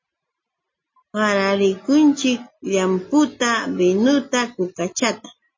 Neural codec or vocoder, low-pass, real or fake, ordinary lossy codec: none; 7.2 kHz; real; MP3, 32 kbps